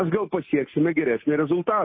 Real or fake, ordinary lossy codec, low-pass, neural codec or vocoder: real; MP3, 24 kbps; 7.2 kHz; none